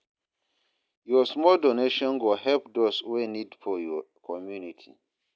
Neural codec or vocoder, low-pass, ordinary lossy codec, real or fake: none; none; none; real